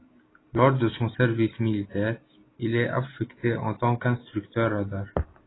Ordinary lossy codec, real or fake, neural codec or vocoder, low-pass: AAC, 16 kbps; real; none; 7.2 kHz